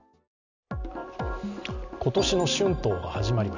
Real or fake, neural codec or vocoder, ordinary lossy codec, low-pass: real; none; none; 7.2 kHz